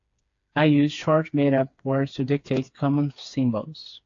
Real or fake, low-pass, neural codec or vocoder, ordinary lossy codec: fake; 7.2 kHz; codec, 16 kHz, 4 kbps, FreqCodec, smaller model; AAC, 48 kbps